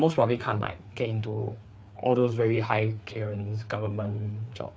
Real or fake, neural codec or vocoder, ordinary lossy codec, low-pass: fake; codec, 16 kHz, 4 kbps, FreqCodec, larger model; none; none